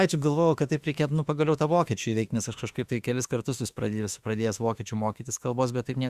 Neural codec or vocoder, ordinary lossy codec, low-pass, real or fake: autoencoder, 48 kHz, 32 numbers a frame, DAC-VAE, trained on Japanese speech; Opus, 64 kbps; 14.4 kHz; fake